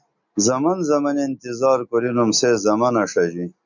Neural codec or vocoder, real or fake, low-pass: none; real; 7.2 kHz